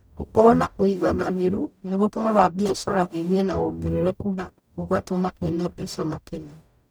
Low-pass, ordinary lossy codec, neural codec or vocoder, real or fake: none; none; codec, 44.1 kHz, 0.9 kbps, DAC; fake